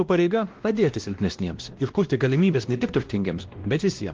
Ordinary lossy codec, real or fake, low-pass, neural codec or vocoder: Opus, 16 kbps; fake; 7.2 kHz; codec, 16 kHz, 1 kbps, X-Codec, WavLM features, trained on Multilingual LibriSpeech